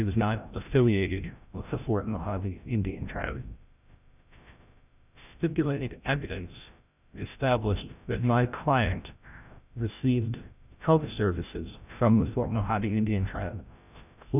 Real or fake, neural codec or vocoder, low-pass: fake; codec, 16 kHz, 0.5 kbps, FreqCodec, larger model; 3.6 kHz